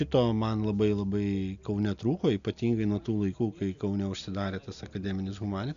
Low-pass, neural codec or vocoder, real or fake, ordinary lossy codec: 7.2 kHz; none; real; AAC, 96 kbps